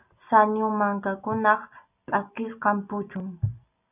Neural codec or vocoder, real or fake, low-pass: none; real; 3.6 kHz